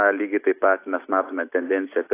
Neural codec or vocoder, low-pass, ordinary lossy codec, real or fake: none; 3.6 kHz; AAC, 24 kbps; real